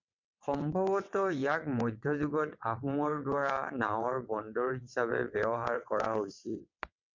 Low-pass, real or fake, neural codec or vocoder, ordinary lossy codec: 7.2 kHz; fake; vocoder, 22.05 kHz, 80 mel bands, WaveNeXt; MP3, 64 kbps